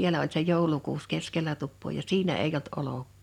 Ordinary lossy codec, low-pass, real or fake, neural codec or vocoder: none; 19.8 kHz; real; none